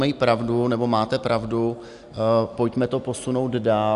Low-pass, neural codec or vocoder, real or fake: 10.8 kHz; none; real